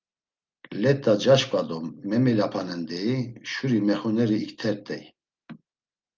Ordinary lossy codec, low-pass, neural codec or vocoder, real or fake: Opus, 32 kbps; 7.2 kHz; none; real